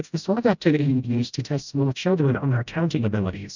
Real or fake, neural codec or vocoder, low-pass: fake; codec, 16 kHz, 0.5 kbps, FreqCodec, smaller model; 7.2 kHz